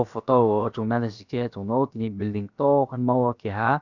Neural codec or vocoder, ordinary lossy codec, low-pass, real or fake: codec, 16 kHz, 0.7 kbps, FocalCodec; none; 7.2 kHz; fake